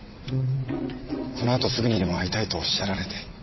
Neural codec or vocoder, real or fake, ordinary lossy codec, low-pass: vocoder, 22.05 kHz, 80 mel bands, WaveNeXt; fake; MP3, 24 kbps; 7.2 kHz